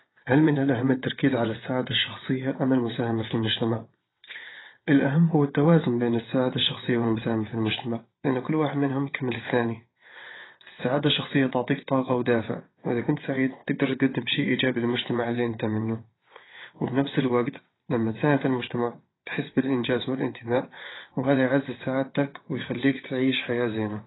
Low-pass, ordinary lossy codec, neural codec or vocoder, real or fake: 7.2 kHz; AAC, 16 kbps; vocoder, 22.05 kHz, 80 mel bands, WaveNeXt; fake